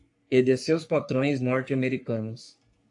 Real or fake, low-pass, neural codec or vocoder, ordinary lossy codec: fake; 10.8 kHz; codec, 44.1 kHz, 3.4 kbps, Pupu-Codec; AAC, 64 kbps